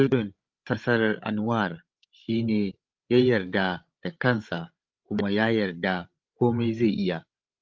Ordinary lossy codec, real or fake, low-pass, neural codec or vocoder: Opus, 24 kbps; fake; 7.2 kHz; codec, 16 kHz, 16 kbps, FreqCodec, larger model